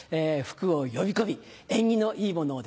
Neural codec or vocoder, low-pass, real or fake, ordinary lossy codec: none; none; real; none